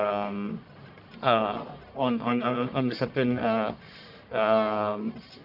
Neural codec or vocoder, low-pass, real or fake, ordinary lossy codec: codec, 44.1 kHz, 1.7 kbps, Pupu-Codec; 5.4 kHz; fake; none